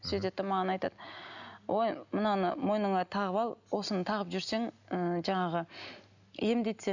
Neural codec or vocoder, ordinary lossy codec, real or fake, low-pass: none; none; real; 7.2 kHz